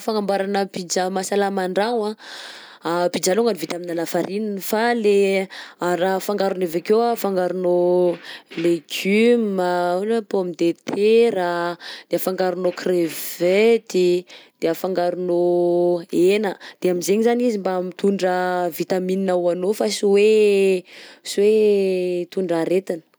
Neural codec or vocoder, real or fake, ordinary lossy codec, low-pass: none; real; none; none